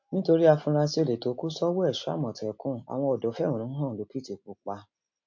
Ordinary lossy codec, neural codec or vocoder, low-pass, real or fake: MP3, 48 kbps; none; 7.2 kHz; real